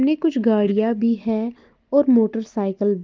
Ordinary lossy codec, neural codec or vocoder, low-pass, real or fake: Opus, 32 kbps; none; 7.2 kHz; real